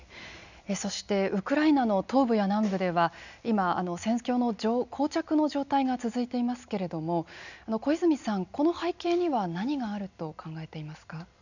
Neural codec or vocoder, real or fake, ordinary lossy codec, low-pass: none; real; none; 7.2 kHz